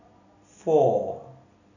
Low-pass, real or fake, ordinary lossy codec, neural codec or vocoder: 7.2 kHz; real; none; none